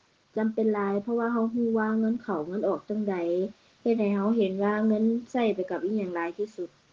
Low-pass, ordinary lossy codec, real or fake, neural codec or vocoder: 7.2 kHz; Opus, 16 kbps; real; none